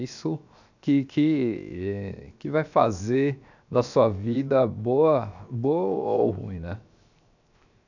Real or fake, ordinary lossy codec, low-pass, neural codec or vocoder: fake; none; 7.2 kHz; codec, 16 kHz, 0.7 kbps, FocalCodec